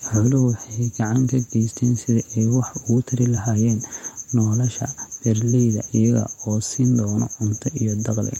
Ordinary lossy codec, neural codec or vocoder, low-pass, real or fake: MP3, 64 kbps; vocoder, 48 kHz, 128 mel bands, Vocos; 19.8 kHz; fake